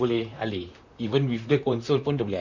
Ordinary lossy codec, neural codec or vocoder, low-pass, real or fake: none; vocoder, 44.1 kHz, 128 mel bands, Pupu-Vocoder; 7.2 kHz; fake